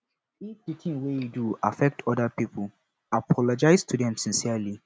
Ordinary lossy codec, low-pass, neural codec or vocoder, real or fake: none; none; none; real